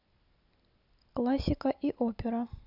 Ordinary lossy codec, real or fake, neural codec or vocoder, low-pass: none; real; none; 5.4 kHz